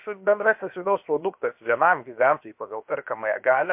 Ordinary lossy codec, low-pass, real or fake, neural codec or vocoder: MP3, 32 kbps; 3.6 kHz; fake; codec, 16 kHz, 0.7 kbps, FocalCodec